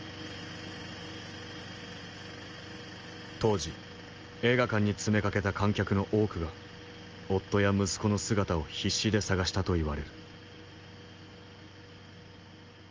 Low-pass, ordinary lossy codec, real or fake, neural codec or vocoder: 7.2 kHz; Opus, 24 kbps; real; none